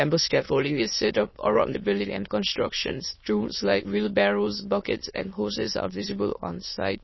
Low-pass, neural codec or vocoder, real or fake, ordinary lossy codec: 7.2 kHz; autoencoder, 22.05 kHz, a latent of 192 numbers a frame, VITS, trained on many speakers; fake; MP3, 24 kbps